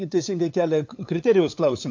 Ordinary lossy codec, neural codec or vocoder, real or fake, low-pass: AAC, 48 kbps; codec, 16 kHz, 8 kbps, FunCodec, trained on LibriTTS, 25 frames a second; fake; 7.2 kHz